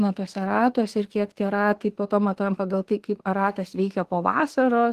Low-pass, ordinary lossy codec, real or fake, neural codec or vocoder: 14.4 kHz; Opus, 16 kbps; fake; autoencoder, 48 kHz, 32 numbers a frame, DAC-VAE, trained on Japanese speech